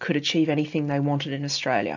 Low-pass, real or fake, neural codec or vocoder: 7.2 kHz; real; none